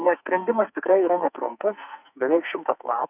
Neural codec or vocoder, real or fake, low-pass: codec, 44.1 kHz, 2.6 kbps, SNAC; fake; 3.6 kHz